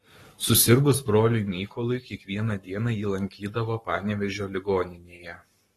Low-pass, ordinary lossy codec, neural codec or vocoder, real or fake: 19.8 kHz; AAC, 32 kbps; codec, 44.1 kHz, 7.8 kbps, Pupu-Codec; fake